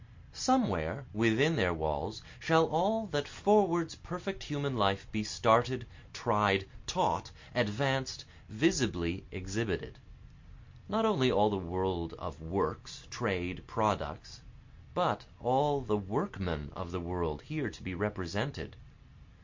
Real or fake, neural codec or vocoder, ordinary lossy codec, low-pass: real; none; MP3, 48 kbps; 7.2 kHz